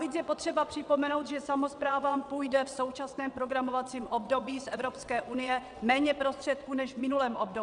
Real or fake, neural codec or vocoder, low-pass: fake; vocoder, 22.05 kHz, 80 mel bands, WaveNeXt; 9.9 kHz